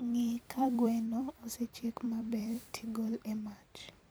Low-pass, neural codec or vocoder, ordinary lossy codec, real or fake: none; vocoder, 44.1 kHz, 128 mel bands every 256 samples, BigVGAN v2; none; fake